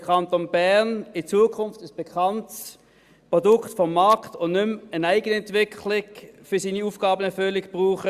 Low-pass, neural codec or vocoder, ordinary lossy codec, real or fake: 14.4 kHz; none; Opus, 64 kbps; real